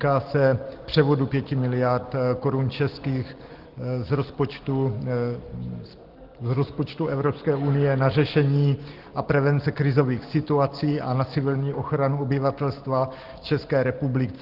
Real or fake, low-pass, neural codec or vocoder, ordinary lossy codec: real; 5.4 kHz; none; Opus, 16 kbps